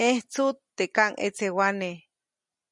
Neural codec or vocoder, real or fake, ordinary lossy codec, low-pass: none; real; MP3, 96 kbps; 10.8 kHz